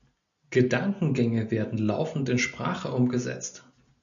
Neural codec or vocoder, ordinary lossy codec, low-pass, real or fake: none; MP3, 96 kbps; 7.2 kHz; real